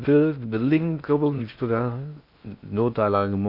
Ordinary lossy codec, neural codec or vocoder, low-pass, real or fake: MP3, 48 kbps; codec, 16 kHz in and 24 kHz out, 0.6 kbps, FocalCodec, streaming, 4096 codes; 5.4 kHz; fake